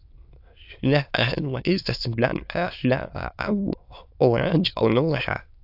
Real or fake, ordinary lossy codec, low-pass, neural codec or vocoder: fake; AAC, 48 kbps; 5.4 kHz; autoencoder, 22.05 kHz, a latent of 192 numbers a frame, VITS, trained on many speakers